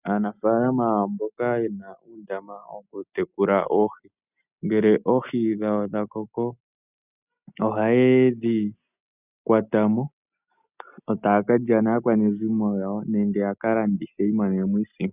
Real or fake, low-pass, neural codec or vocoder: real; 3.6 kHz; none